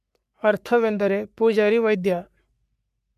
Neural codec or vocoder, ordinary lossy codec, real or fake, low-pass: codec, 44.1 kHz, 3.4 kbps, Pupu-Codec; MP3, 96 kbps; fake; 14.4 kHz